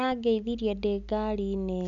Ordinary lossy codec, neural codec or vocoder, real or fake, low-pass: none; none; real; 7.2 kHz